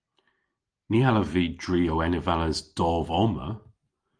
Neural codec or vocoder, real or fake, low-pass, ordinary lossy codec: none; real; 9.9 kHz; Opus, 24 kbps